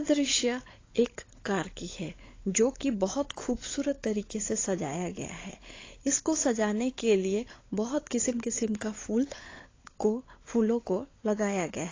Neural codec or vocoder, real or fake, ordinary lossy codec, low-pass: codec, 16 kHz, 16 kbps, FunCodec, trained on LibriTTS, 50 frames a second; fake; AAC, 32 kbps; 7.2 kHz